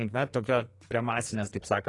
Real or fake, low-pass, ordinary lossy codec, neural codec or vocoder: fake; 10.8 kHz; AAC, 32 kbps; codec, 44.1 kHz, 2.6 kbps, SNAC